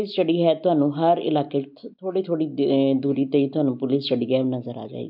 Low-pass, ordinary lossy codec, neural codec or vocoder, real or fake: 5.4 kHz; none; none; real